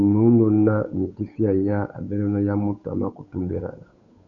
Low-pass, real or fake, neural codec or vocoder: 7.2 kHz; fake; codec, 16 kHz, 8 kbps, FunCodec, trained on Chinese and English, 25 frames a second